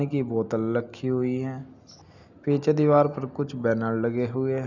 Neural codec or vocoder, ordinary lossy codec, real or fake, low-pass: none; none; real; 7.2 kHz